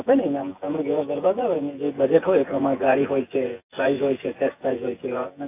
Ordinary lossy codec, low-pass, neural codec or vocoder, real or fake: AAC, 24 kbps; 3.6 kHz; vocoder, 24 kHz, 100 mel bands, Vocos; fake